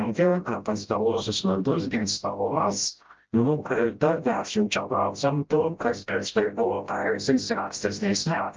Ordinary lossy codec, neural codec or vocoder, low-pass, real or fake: Opus, 24 kbps; codec, 16 kHz, 0.5 kbps, FreqCodec, smaller model; 7.2 kHz; fake